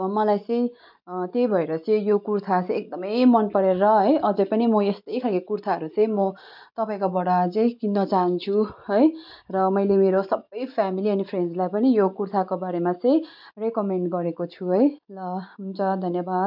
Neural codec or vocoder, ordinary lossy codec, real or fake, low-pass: none; MP3, 48 kbps; real; 5.4 kHz